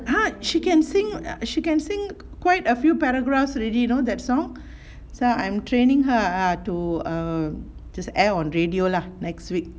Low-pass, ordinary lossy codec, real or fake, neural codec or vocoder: none; none; real; none